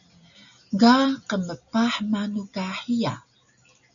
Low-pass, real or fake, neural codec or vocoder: 7.2 kHz; real; none